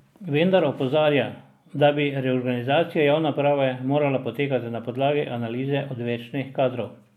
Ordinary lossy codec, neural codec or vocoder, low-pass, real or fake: none; none; 19.8 kHz; real